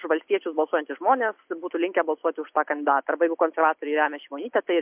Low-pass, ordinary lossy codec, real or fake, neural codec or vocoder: 3.6 kHz; MP3, 32 kbps; real; none